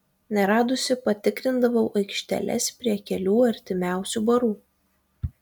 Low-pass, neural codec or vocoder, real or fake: 19.8 kHz; none; real